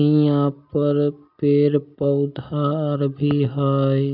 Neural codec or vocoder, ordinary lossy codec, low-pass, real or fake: none; AAC, 48 kbps; 5.4 kHz; real